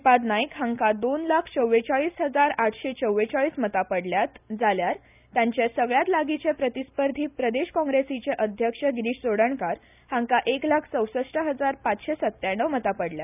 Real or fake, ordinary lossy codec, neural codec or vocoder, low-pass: real; none; none; 3.6 kHz